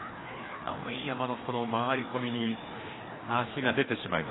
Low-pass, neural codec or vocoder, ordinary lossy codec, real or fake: 7.2 kHz; codec, 16 kHz, 2 kbps, FreqCodec, larger model; AAC, 16 kbps; fake